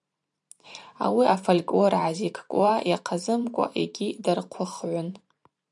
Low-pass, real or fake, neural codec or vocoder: 10.8 kHz; fake; vocoder, 44.1 kHz, 128 mel bands every 512 samples, BigVGAN v2